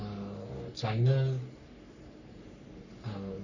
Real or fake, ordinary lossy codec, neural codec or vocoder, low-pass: fake; none; codec, 44.1 kHz, 3.4 kbps, Pupu-Codec; 7.2 kHz